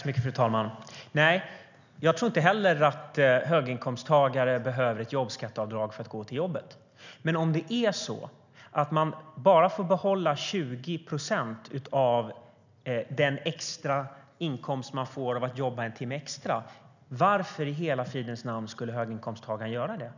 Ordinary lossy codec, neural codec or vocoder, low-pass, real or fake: none; none; 7.2 kHz; real